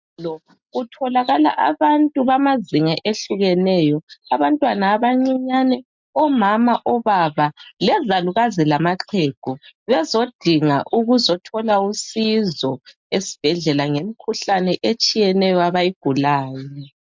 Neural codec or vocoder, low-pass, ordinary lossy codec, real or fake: none; 7.2 kHz; MP3, 64 kbps; real